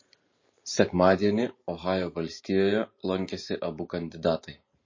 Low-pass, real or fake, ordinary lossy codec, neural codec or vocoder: 7.2 kHz; fake; MP3, 32 kbps; vocoder, 44.1 kHz, 128 mel bands every 512 samples, BigVGAN v2